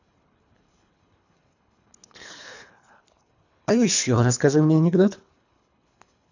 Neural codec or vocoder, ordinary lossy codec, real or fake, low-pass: codec, 24 kHz, 3 kbps, HILCodec; none; fake; 7.2 kHz